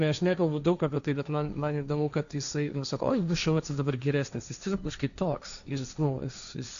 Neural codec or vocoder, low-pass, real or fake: codec, 16 kHz, 1.1 kbps, Voila-Tokenizer; 7.2 kHz; fake